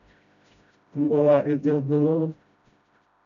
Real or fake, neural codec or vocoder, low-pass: fake; codec, 16 kHz, 0.5 kbps, FreqCodec, smaller model; 7.2 kHz